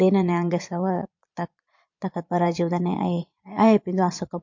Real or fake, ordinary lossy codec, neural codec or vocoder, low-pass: fake; MP3, 48 kbps; vocoder, 22.05 kHz, 80 mel bands, Vocos; 7.2 kHz